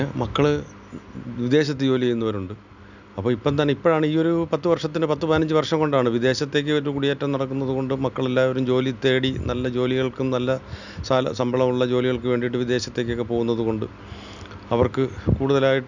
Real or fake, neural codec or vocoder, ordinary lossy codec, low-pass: real; none; none; 7.2 kHz